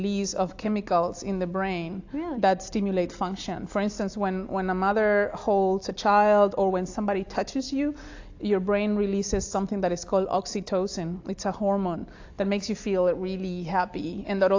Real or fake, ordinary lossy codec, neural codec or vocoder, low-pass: real; AAC, 48 kbps; none; 7.2 kHz